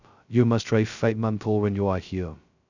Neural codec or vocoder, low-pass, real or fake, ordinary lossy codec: codec, 16 kHz, 0.2 kbps, FocalCodec; 7.2 kHz; fake; none